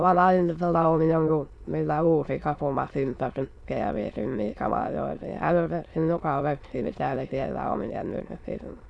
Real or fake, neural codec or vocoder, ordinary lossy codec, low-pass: fake; autoencoder, 22.05 kHz, a latent of 192 numbers a frame, VITS, trained on many speakers; none; none